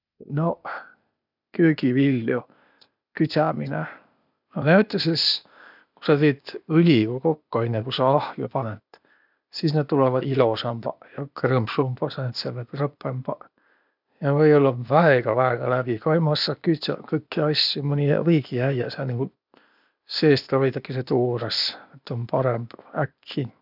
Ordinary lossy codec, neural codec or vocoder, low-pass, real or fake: none; codec, 16 kHz, 0.8 kbps, ZipCodec; 5.4 kHz; fake